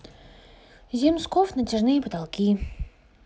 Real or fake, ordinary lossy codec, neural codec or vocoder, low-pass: real; none; none; none